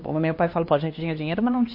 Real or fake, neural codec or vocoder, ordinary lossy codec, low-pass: fake; codec, 16 kHz, 2 kbps, X-Codec, HuBERT features, trained on LibriSpeech; MP3, 32 kbps; 5.4 kHz